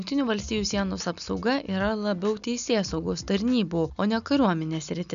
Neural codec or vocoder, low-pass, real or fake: none; 7.2 kHz; real